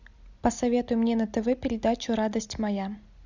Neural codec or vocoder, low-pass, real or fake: none; 7.2 kHz; real